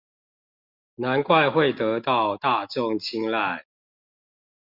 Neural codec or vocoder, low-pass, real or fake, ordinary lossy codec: none; 5.4 kHz; real; Opus, 64 kbps